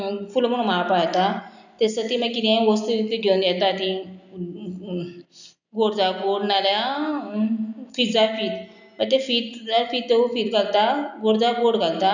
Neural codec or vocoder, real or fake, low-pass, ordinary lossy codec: none; real; 7.2 kHz; none